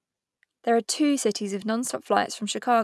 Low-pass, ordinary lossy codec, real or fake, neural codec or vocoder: none; none; real; none